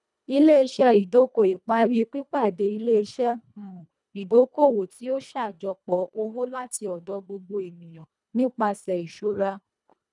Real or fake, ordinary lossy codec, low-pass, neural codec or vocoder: fake; none; 10.8 kHz; codec, 24 kHz, 1.5 kbps, HILCodec